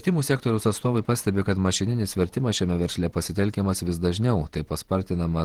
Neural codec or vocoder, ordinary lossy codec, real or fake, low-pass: vocoder, 48 kHz, 128 mel bands, Vocos; Opus, 16 kbps; fake; 19.8 kHz